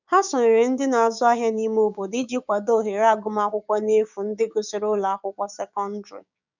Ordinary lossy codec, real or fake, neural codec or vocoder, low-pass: none; fake; codec, 16 kHz, 6 kbps, DAC; 7.2 kHz